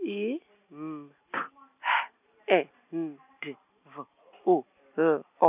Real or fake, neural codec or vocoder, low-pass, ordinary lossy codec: real; none; 3.6 kHz; none